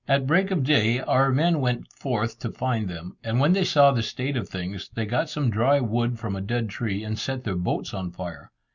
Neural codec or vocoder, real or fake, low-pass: none; real; 7.2 kHz